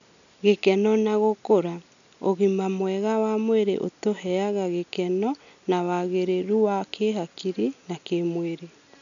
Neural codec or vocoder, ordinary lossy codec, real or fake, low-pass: none; none; real; 7.2 kHz